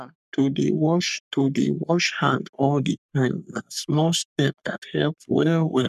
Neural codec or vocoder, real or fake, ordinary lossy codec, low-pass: codec, 44.1 kHz, 3.4 kbps, Pupu-Codec; fake; none; 14.4 kHz